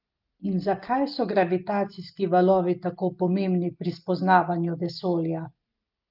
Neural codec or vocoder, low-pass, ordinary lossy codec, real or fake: none; 5.4 kHz; Opus, 24 kbps; real